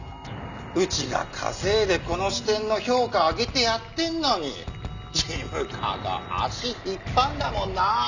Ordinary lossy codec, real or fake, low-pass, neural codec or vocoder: none; real; 7.2 kHz; none